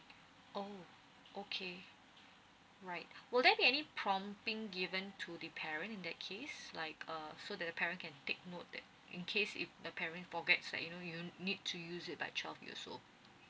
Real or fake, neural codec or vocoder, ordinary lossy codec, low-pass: real; none; none; none